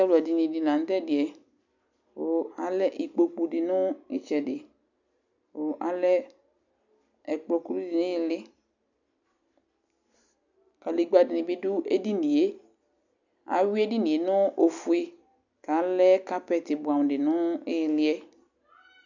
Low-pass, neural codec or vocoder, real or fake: 7.2 kHz; none; real